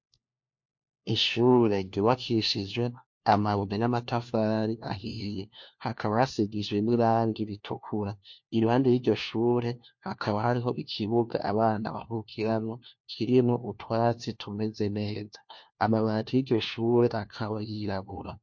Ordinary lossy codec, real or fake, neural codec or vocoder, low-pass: MP3, 48 kbps; fake; codec, 16 kHz, 1 kbps, FunCodec, trained on LibriTTS, 50 frames a second; 7.2 kHz